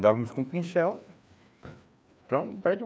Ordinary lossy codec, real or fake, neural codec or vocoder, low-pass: none; fake; codec, 16 kHz, 2 kbps, FreqCodec, larger model; none